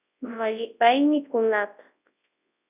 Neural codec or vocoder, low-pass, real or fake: codec, 24 kHz, 0.9 kbps, WavTokenizer, large speech release; 3.6 kHz; fake